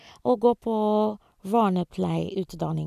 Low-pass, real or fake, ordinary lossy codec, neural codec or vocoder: 14.4 kHz; real; none; none